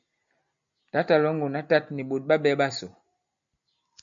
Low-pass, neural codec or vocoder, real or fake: 7.2 kHz; none; real